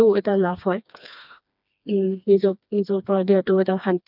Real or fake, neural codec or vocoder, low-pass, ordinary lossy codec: fake; codec, 16 kHz, 2 kbps, FreqCodec, smaller model; 5.4 kHz; none